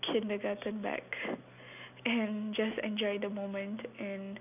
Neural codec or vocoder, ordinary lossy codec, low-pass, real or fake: none; none; 3.6 kHz; real